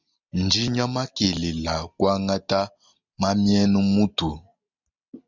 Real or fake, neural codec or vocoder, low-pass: real; none; 7.2 kHz